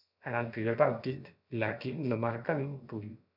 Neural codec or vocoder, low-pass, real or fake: codec, 16 kHz, about 1 kbps, DyCAST, with the encoder's durations; 5.4 kHz; fake